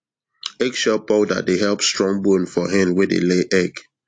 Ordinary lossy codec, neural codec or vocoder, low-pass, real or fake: AAC, 48 kbps; none; 9.9 kHz; real